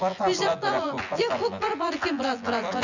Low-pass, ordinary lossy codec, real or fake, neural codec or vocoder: 7.2 kHz; none; fake; vocoder, 24 kHz, 100 mel bands, Vocos